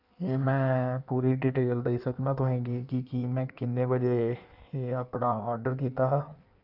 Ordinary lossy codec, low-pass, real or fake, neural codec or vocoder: none; 5.4 kHz; fake; codec, 16 kHz in and 24 kHz out, 1.1 kbps, FireRedTTS-2 codec